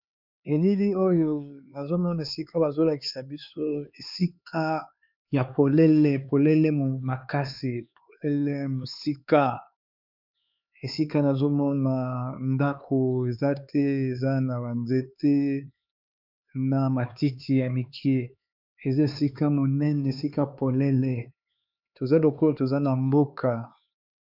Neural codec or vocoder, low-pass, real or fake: codec, 16 kHz, 4 kbps, X-Codec, HuBERT features, trained on LibriSpeech; 5.4 kHz; fake